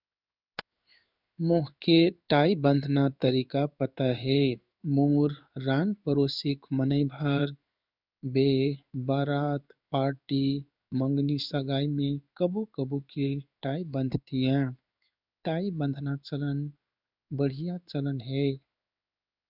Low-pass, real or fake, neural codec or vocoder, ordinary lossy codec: 5.4 kHz; fake; codec, 16 kHz in and 24 kHz out, 1 kbps, XY-Tokenizer; none